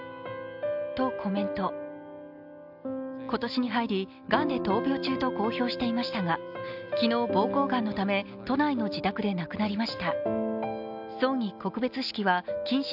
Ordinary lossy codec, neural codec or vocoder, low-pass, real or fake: AAC, 48 kbps; none; 5.4 kHz; real